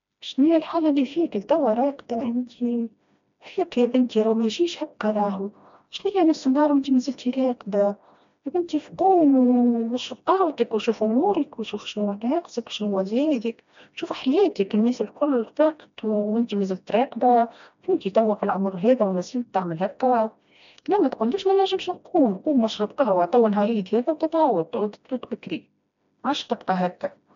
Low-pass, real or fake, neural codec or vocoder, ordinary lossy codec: 7.2 kHz; fake; codec, 16 kHz, 1 kbps, FreqCodec, smaller model; MP3, 64 kbps